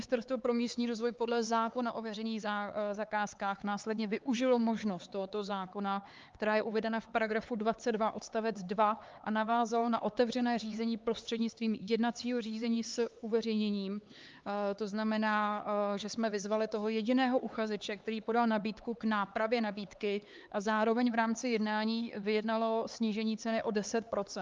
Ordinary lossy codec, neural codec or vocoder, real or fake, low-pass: Opus, 32 kbps; codec, 16 kHz, 4 kbps, X-Codec, HuBERT features, trained on LibriSpeech; fake; 7.2 kHz